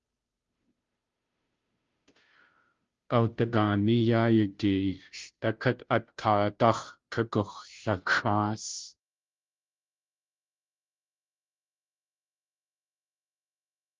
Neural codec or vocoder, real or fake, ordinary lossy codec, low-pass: codec, 16 kHz, 0.5 kbps, FunCodec, trained on Chinese and English, 25 frames a second; fake; Opus, 32 kbps; 7.2 kHz